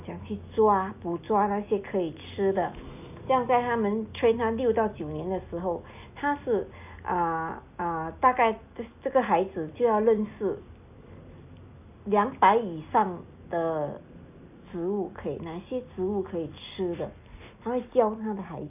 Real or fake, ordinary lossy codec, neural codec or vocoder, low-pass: real; none; none; 3.6 kHz